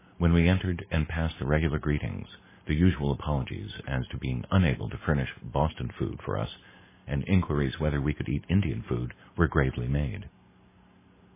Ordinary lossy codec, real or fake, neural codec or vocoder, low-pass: MP3, 16 kbps; real; none; 3.6 kHz